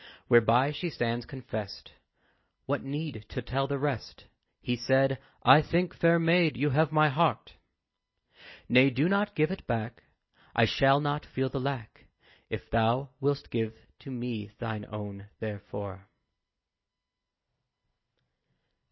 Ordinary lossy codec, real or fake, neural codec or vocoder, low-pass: MP3, 24 kbps; real; none; 7.2 kHz